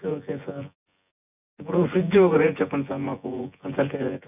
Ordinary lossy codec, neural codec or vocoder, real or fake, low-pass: none; vocoder, 24 kHz, 100 mel bands, Vocos; fake; 3.6 kHz